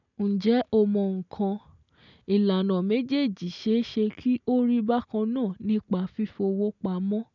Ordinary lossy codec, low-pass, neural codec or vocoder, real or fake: none; 7.2 kHz; none; real